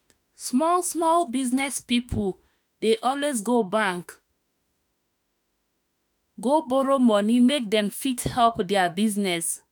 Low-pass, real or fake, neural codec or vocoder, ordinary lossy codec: none; fake; autoencoder, 48 kHz, 32 numbers a frame, DAC-VAE, trained on Japanese speech; none